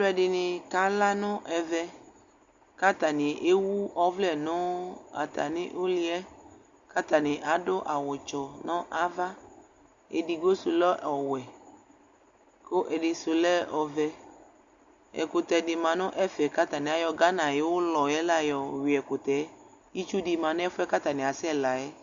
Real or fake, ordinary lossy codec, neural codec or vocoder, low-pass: real; Opus, 64 kbps; none; 7.2 kHz